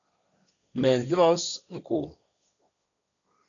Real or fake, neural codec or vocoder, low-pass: fake; codec, 16 kHz, 1.1 kbps, Voila-Tokenizer; 7.2 kHz